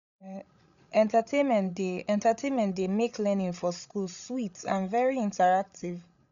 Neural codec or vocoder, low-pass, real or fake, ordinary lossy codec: codec, 16 kHz, 16 kbps, FreqCodec, larger model; 7.2 kHz; fake; MP3, 96 kbps